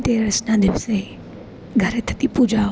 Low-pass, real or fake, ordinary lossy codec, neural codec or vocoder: none; real; none; none